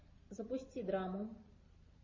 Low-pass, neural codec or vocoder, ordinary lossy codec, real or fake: 7.2 kHz; none; MP3, 32 kbps; real